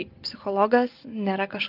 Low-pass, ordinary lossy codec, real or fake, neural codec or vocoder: 5.4 kHz; Opus, 32 kbps; real; none